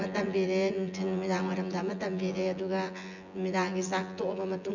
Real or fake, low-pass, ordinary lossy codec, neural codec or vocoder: fake; 7.2 kHz; none; vocoder, 24 kHz, 100 mel bands, Vocos